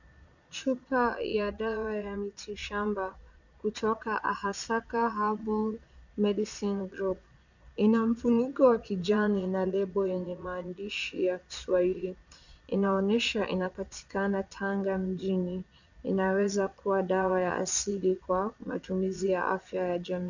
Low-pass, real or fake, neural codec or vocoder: 7.2 kHz; fake; vocoder, 22.05 kHz, 80 mel bands, Vocos